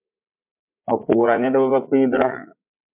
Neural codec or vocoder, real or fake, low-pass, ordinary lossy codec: vocoder, 44.1 kHz, 80 mel bands, Vocos; fake; 3.6 kHz; MP3, 32 kbps